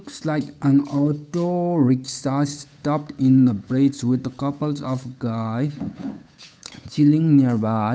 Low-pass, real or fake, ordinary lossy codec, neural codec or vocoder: none; fake; none; codec, 16 kHz, 8 kbps, FunCodec, trained on Chinese and English, 25 frames a second